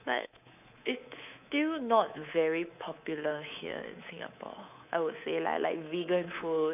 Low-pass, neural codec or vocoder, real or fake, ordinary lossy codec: 3.6 kHz; codec, 24 kHz, 3.1 kbps, DualCodec; fake; none